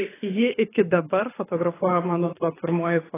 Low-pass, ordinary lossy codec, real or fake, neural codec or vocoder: 3.6 kHz; AAC, 16 kbps; fake; codec, 24 kHz, 6 kbps, HILCodec